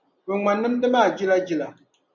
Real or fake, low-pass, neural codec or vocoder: real; 7.2 kHz; none